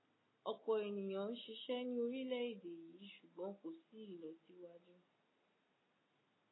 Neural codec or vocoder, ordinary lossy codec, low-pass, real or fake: none; AAC, 16 kbps; 7.2 kHz; real